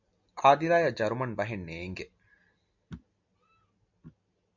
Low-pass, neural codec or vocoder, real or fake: 7.2 kHz; none; real